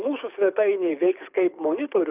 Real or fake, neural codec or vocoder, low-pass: fake; codec, 24 kHz, 6 kbps, HILCodec; 3.6 kHz